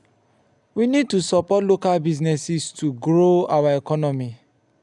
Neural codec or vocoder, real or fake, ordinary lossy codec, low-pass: none; real; none; 10.8 kHz